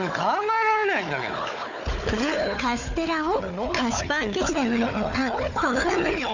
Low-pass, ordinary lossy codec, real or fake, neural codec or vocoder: 7.2 kHz; none; fake; codec, 16 kHz, 4 kbps, FunCodec, trained on Chinese and English, 50 frames a second